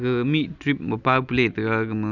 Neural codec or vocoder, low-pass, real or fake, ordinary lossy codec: none; 7.2 kHz; real; none